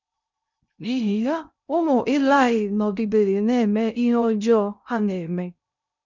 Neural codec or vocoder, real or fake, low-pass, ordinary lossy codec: codec, 16 kHz in and 24 kHz out, 0.6 kbps, FocalCodec, streaming, 2048 codes; fake; 7.2 kHz; none